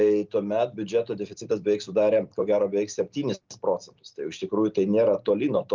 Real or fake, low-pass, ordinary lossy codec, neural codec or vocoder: real; 7.2 kHz; Opus, 24 kbps; none